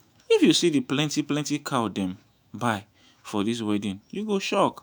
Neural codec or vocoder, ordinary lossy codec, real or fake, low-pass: autoencoder, 48 kHz, 128 numbers a frame, DAC-VAE, trained on Japanese speech; none; fake; none